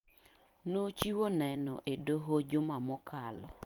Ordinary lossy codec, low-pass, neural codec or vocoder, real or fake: Opus, 32 kbps; 19.8 kHz; vocoder, 44.1 kHz, 128 mel bands every 256 samples, BigVGAN v2; fake